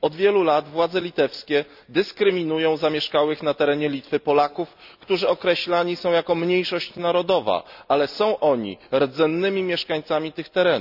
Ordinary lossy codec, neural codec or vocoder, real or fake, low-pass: none; none; real; 5.4 kHz